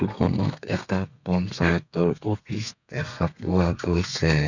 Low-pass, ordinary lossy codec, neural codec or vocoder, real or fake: 7.2 kHz; none; codec, 32 kHz, 1.9 kbps, SNAC; fake